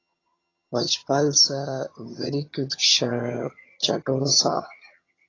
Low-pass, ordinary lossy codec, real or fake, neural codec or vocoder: 7.2 kHz; AAC, 32 kbps; fake; vocoder, 22.05 kHz, 80 mel bands, HiFi-GAN